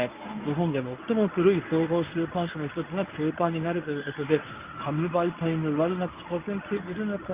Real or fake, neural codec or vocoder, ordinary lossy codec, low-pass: fake; codec, 24 kHz, 0.9 kbps, WavTokenizer, medium speech release version 1; Opus, 24 kbps; 3.6 kHz